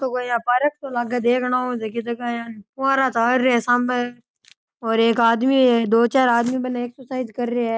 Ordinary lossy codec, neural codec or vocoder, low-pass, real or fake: none; none; none; real